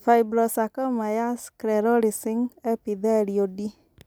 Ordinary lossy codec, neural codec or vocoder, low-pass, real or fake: none; none; none; real